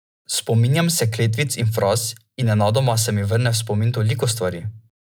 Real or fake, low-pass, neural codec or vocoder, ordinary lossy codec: real; none; none; none